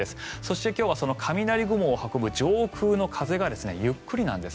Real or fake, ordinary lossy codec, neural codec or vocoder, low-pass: real; none; none; none